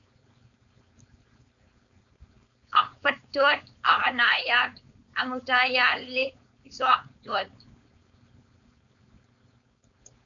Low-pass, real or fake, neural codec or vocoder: 7.2 kHz; fake; codec, 16 kHz, 4.8 kbps, FACodec